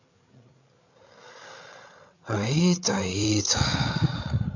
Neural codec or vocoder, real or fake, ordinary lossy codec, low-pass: codec, 16 kHz, 8 kbps, FreqCodec, larger model; fake; none; 7.2 kHz